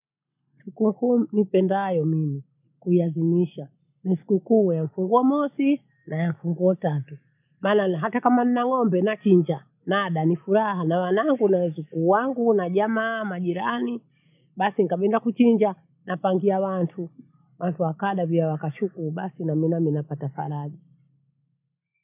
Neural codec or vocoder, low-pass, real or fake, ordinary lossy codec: none; 3.6 kHz; real; none